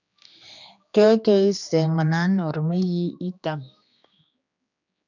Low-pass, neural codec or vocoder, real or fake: 7.2 kHz; codec, 16 kHz, 2 kbps, X-Codec, HuBERT features, trained on general audio; fake